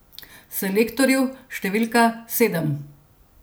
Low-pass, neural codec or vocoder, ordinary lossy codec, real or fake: none; none; none; real